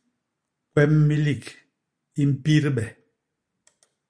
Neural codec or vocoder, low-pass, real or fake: none; 9.9 kHz; real